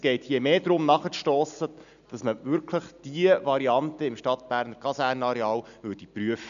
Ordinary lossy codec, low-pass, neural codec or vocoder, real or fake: none; 7.2 kHz; none; real